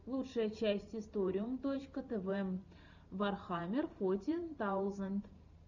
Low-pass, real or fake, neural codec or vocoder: 7.2 kHz; fake; vocoder, 44.1 kHz, 128 mel bands every 512 samples, BigVGAN v2